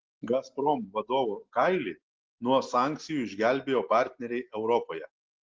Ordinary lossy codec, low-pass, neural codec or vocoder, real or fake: Opus, 16 kbps; 7.2 kHz; none; real